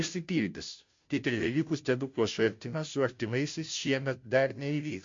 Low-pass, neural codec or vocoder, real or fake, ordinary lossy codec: 7.2 kHz; codec, 16 kHz, 0.5 kbps, FunCodec, trained on Chinese and English, 25 frames a second; fake; MP3, 48 kbps